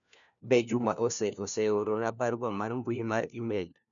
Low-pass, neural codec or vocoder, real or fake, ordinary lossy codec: 7.2 kHz; codec, 16 kHz, 1 kbps, FunCodec, trained on LibriTTS, 50 frames a second; fake; none